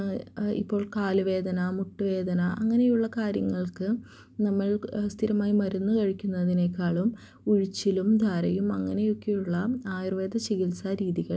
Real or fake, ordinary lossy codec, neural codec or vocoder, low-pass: real; none; none; none